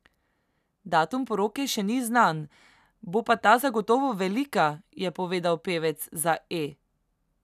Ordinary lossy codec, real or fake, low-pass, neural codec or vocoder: none; real; 14.4 kHz; none